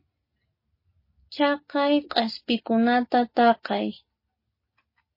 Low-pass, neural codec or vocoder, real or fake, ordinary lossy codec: 5.4 kHz; none; real; MP3, 24 kbps